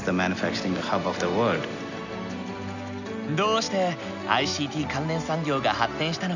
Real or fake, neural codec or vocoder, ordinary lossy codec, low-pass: real; none; none; 7.2 kHz